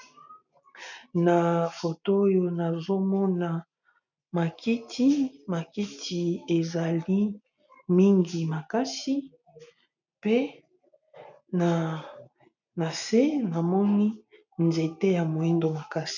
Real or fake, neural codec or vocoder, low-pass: real; none; 7.2 kHz